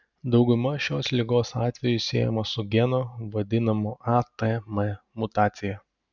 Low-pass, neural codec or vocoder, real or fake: 7.2 kHz; none; real